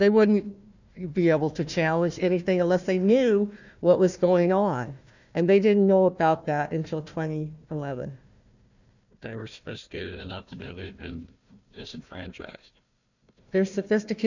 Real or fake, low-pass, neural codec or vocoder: fake; 7.2 kHz; codec, 16 kHz, 1 kbps, FunCodec, trained on Chinese and English, 50 frames a second